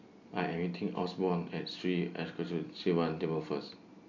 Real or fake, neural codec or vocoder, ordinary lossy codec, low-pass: real; none; none; 7.2 kHz